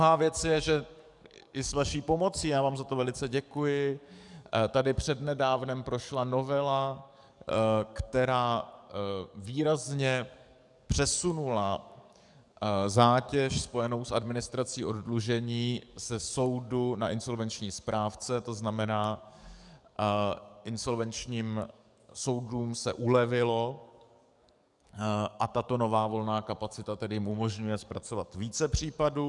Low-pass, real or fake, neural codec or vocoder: 10.8 kHz; fake; codec, 44.1 kHz, 7.8 kbps, DAC